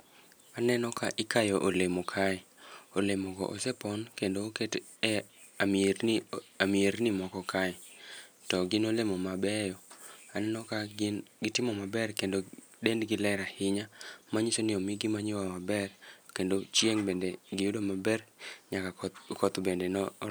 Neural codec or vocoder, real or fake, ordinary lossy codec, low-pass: none; real; none; none